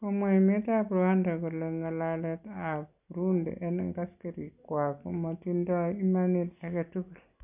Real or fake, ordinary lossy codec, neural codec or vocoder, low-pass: real; none; none; 3.6 kHz